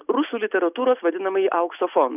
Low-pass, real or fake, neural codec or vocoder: 3.6 kHz; real; none